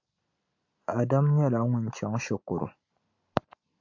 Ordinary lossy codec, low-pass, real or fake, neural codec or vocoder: AAC, 48 kbps; 7.2 kHz; real; none